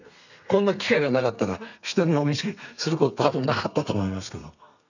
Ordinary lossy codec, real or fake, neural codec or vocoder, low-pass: none; fake; codec, 32 kHz, 1.9 kbps, SNAC; 7.2 kHz